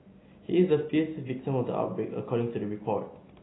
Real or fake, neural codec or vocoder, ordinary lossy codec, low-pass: real; none; AAC, 16 kbps; 7.2 kHz